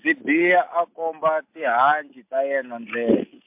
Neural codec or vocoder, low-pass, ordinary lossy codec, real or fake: none; 3.6 kHz; MP3, 32 kbps; real